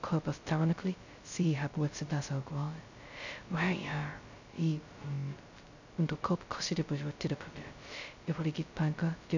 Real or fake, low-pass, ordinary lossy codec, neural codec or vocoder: fake; 7.2 kHz; none; codec, 16 kHz, 0.2 kbps, FocalCodec